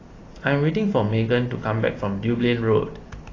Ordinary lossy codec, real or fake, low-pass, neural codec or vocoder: AAC, 32 kbps; real; 7.2 kHz; none